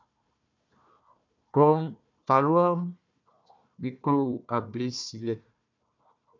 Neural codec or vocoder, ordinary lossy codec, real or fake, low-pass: codec, 16 kHz, 1 kbps, FunCodec, trained on Chinese and English, 50 frames a second; MP3, 64 kbps; fake; 7.2 kHz